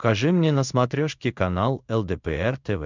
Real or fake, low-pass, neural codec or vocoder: fake; 7.2 kHz; codec, 16 kHz in and 24 kHz out, 1 kbps, XY-Tokenizer